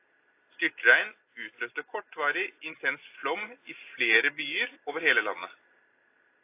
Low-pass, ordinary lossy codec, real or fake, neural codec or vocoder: 3.6 kHz; AAC, 24 kbps; real; none